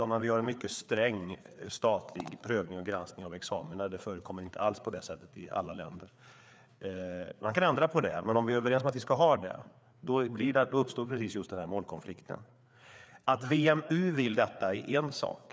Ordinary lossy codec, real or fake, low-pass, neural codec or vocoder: none; fake; none; codec, 16 kHz, 4 kbps, FreqCodec, larger model